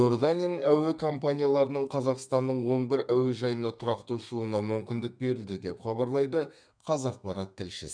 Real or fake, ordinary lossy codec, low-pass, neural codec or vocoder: fake; none; 9.9 kHz; codec, 32 kHz, 1.9 kbps, SNAC